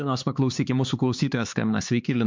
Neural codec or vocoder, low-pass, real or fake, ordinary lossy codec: codec, 16 kHz, 4 kbps, X-Codec, HuBERT features, trained on LibriSpeech; 7.2 kHz; fake; MP3, 64 kbps